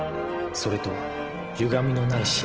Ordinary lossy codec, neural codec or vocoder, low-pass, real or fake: Opus, 16 kbps; none; 7.2 kHz; real